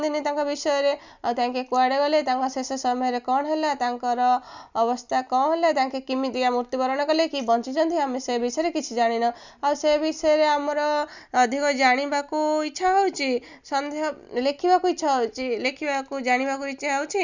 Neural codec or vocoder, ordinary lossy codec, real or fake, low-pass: none; none; real; 7.2 kHz